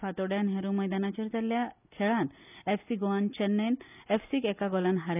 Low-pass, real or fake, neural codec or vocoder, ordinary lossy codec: 3.6 kHz; real; none; none